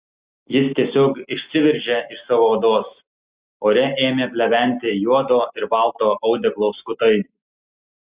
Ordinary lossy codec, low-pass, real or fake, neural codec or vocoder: Opus, 64 kbps; 3.6 kHz; real; none